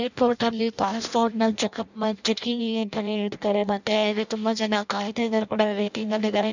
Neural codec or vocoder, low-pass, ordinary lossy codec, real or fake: codec, 16 kHz in and 24 kHz out, 0.6 kbps, FireRedTTS-2 codec; 7.2 kHz; none; fake